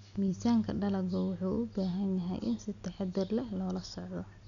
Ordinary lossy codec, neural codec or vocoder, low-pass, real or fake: none; none; 7.2 kHz; real